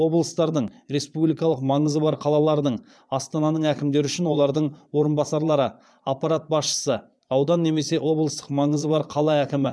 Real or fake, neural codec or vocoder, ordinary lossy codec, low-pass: fake; vocoder, 22.05 kHz, 80 mel bands, Vocos; none; none